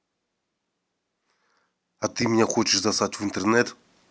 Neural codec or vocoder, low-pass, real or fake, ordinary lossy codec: none; none; real; none